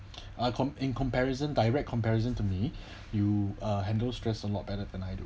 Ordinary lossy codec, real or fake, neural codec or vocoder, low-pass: none; real; none; none